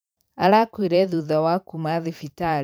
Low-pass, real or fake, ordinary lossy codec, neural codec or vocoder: none; fake; none; vocoder, 44.1 kHz, 128 mel bands every 512 samples, BigVGAN v2